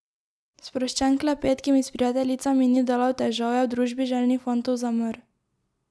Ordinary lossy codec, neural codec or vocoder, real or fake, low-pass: none; none; real; none